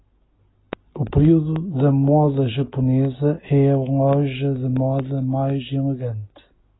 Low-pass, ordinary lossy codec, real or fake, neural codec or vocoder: 7.2 kHz; AAC, 16 kbps; real; none